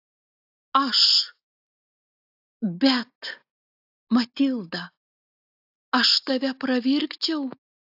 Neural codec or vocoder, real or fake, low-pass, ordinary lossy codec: none; real; 5.4 kHz; AAC, 48 kbps